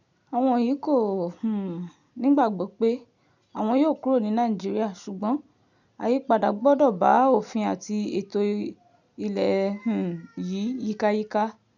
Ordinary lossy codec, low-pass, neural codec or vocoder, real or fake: none; 7.2 kHz; none; real